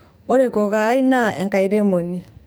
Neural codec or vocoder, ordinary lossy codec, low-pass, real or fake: codec, 44.1 kHz, 2.6 kbps, SNAC; none; none; fake